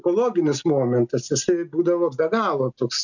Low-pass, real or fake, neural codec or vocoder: 7.2 kHz; real; none